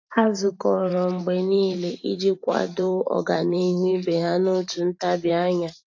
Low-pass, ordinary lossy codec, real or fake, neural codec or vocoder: 7.2 kHz; AAC, 48 kbps; fake; vocoder, 44.1 kHz, 128 mel bands every 512 samples, BigVGAN v2